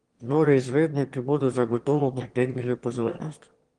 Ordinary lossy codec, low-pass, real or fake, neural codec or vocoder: Opus, 24 kbps; 9.9 kHz; fake; autoencoder, 22.05 kHz, a latent of 192 numbers a frame, VITS, trained on one speaker